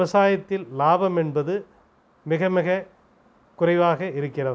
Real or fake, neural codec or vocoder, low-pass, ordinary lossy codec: real; none; none; none